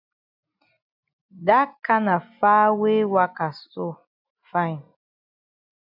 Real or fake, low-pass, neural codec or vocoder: real; 5.4 kHz; none